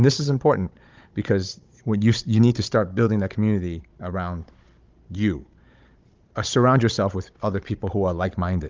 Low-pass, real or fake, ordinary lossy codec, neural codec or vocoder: 7.2 kHz; fake; Opus, 32 kbps; codec, 16 kHz, 16 kbps, FunCodec, trained on Chinese and English, 50 frames a second